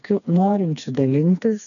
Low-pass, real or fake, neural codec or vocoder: 7.2 kHz; fake; codec, 16 kHz, 2 kbps, FreqCodec, smaller model